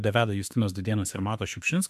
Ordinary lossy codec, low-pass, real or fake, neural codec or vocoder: MP3, 96 kbps; 14.4 kHz; fake; autoencoder, 48 kHz, 32 numbers a frame, DAC-VAE, trained on Japanese speech